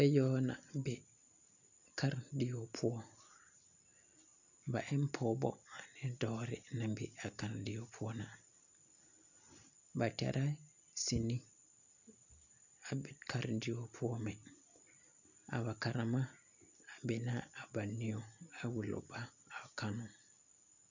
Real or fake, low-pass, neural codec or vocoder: real; 7.2 kHz; none